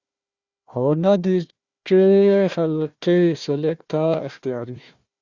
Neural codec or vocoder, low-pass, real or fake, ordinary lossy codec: codec, 16 kHz, 1 kbps, FunCodec, trained on Chinese and English, 50 frames a second; 7.2 kHz; fake; Opus, 64 kbps